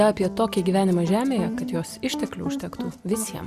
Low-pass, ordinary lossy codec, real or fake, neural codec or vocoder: 14.4 kHz; Opus, 64 kbps; real; none